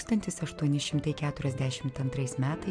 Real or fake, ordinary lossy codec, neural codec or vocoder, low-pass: real; MP3, 96 kbps; none; 9.9 kHz